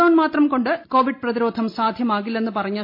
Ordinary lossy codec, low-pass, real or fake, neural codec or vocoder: MP3, 32 kbps; 5.4 kHz; real; none